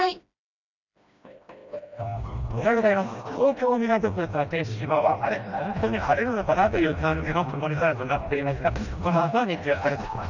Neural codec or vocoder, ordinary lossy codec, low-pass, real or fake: codec, 16 kHz, 1 kbps, FreqCodec, smaller model; none; 7.2 kHz; fake